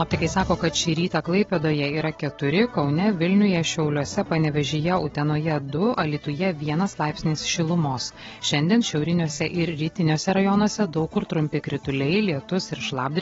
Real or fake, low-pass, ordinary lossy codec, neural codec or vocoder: real; 7.2 kHz; AAC, 24 kbps; none